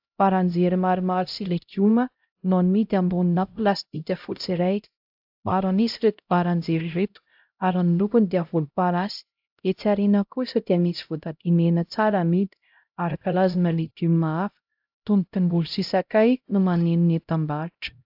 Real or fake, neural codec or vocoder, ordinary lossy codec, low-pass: fake; codec, 16 kHz, 0.5 kbps, X-Codec, HuBERT features, trained on LibriSpeech; AAC, 48 kbps; 5.4 kHz